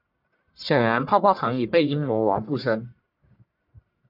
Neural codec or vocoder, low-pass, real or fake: codec, 44.1 kHz, 1.7 kbps, Pupu-Codec; 5.4 kHz; fake